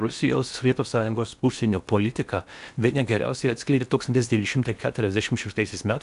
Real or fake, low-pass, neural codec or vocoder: fake; 10.8 kHz; codec, 16 kHz in and 24 kHz out, 0.8 kbps, FocalCodec, streaming, 65536 codes